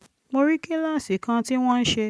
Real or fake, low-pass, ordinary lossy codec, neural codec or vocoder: real; none; none; none